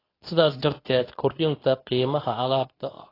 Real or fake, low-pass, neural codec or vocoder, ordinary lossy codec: fake; 5.4 kHz; codec, 24 kHz, 0.9 kbps, WavTokenizer, medium speech release version 2; AAC, 24 kbps